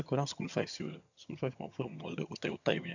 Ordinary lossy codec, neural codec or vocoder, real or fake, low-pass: none; vocoder, 22.05 kHz, 80 mel bands, HiFi-GAN; fake; 7.2 kHz